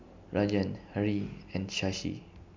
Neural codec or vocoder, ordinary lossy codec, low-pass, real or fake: none; MP3, 64 kbps; 7.2 kHz; real